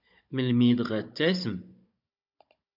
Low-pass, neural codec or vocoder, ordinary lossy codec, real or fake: 5.4 kHz; codec, 16 kHz, 16 kbps, FunCodec, trained on Chinese and English, 50 frames a second; MP3, 48 kbps; fake